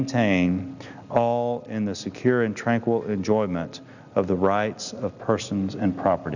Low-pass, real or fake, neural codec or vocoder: 7.2 kHz; real; none